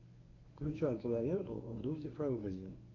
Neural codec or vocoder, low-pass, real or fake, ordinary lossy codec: codec, 24 kHz, 0.9 kbps, WavTokenizer, medium speech release version 1; 7.2 kHz; fake; AAC, 32 kbps